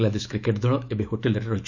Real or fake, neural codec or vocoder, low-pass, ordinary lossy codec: fake; autoencoder, 48 kHz, 128 numbers a frame, DAC-VAE, trained on Japanese speech; 7.2 kHz; none